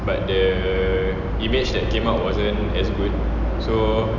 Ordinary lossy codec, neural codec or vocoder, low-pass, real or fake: none; none; 7.2 kHz; real